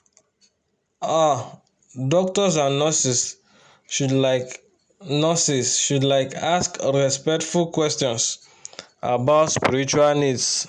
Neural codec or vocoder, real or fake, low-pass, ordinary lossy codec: none; real; 9.9 kHz; none